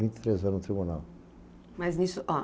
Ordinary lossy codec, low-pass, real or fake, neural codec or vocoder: none; none; real; none